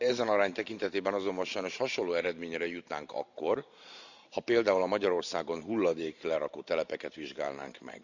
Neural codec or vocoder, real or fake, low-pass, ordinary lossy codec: vocoder, 44.1 kHz, 128 mel bands every 512 samples, BigVGAN v2; fake; 7.2 kHz; none